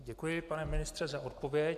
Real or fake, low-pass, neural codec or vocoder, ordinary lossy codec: real; 14.4 kHz; none; AAC, 96 kbps